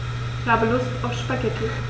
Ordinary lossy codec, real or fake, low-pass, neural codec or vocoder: none; real; none; none